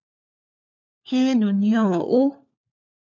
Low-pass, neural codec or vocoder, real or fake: 7.2 kHz; codec, 16 kHz, 4 kbps, FunCodec, trained on LibriTTS, 50 frames a second; fake